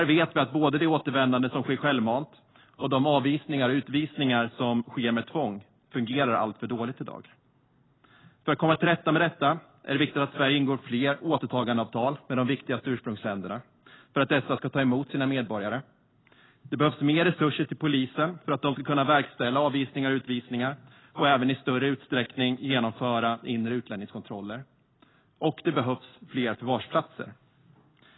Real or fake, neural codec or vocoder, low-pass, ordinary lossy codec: real; none; 7.2 kHz; AAC, 16 kbps